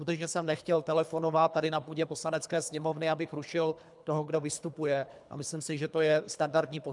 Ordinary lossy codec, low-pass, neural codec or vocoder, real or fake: MP3, 96 kbps; 10.8 kHz; codec, 24 kHz, 3 kbps, HILCodec; fake